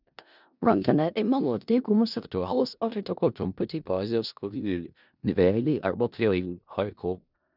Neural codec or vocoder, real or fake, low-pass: codec, 16 kHz in and 24 kHz out, 0.4 kbps, LongCat-Audio-Codec, four codebook decoder; fake; 5.4 kHz